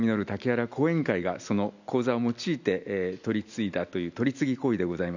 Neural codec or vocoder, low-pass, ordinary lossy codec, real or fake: none; 7.2 kHz; none; real